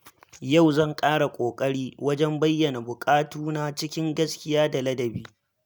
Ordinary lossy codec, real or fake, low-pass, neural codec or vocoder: none; real; none; none